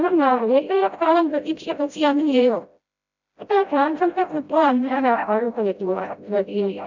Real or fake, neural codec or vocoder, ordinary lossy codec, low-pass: fake; codec, 16 kHz, 0.5 kbps, FreqCodec, smaller model; none; 7.2 kHz